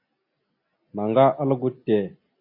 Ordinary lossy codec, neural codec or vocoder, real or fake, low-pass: MP3, 24 kbps; none; real; 5.4 kHz